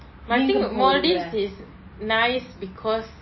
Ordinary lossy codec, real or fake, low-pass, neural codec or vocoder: MP3, 24 kbps; real; 7.2 kHz; none